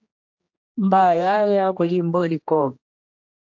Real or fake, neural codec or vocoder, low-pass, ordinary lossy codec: fake; codec, 16 kHz, 1 kbps, X-Codec, HuBERT features, trained on general audio; 7.2 kHz; AAC, 48 kbps